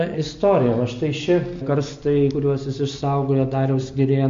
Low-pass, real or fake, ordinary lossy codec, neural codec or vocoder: 7.2 kHz; real; MP3, 48 kbps; none